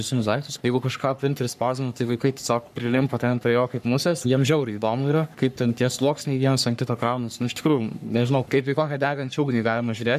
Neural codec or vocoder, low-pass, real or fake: codec, 44.1 kHz, 3.4 kbps, Pupu-Codec; 14.4 kHz; fake